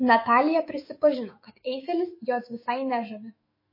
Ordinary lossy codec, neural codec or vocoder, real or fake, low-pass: MP3, 24 kbps; autoencoder, 48 kHz, 128 numbers a frame, DAC-VAE, trained on Japanese speech; fake; 5.4 kHz